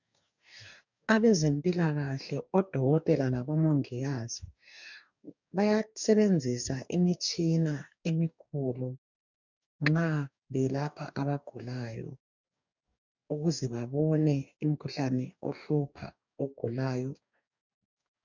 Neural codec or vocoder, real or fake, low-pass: codec, 44.1 kHz, 2.6 kbps, DAC; fake; 7.2 kHz